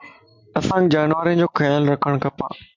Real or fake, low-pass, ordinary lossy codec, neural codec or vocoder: real; 7.2 kHz; MP3, 64 kbps; none